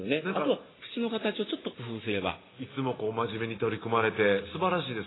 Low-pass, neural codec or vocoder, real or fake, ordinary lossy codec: 7.2 kHz; none; real; AAC, 16 kbps